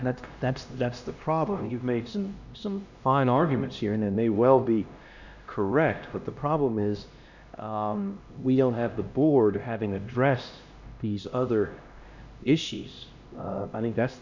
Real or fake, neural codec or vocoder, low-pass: fake; codec, 16 kHz, 1 kbps, X-Codec, HuBERT features, trained on LibriSpeech; 7.2 kHz